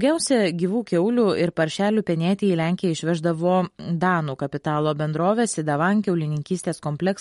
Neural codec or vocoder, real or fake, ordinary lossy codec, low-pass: none; real; MP3, 48 kbps; 19.8 kHz